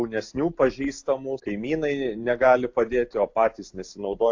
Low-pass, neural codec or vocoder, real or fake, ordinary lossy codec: 7.2 kHz; none; real; AAC, 48 kbps